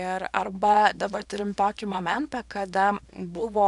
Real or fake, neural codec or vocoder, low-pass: fake; codec, 24 kHz, 0.9 kbps, WavTokenizer, small release; 10.8 kHz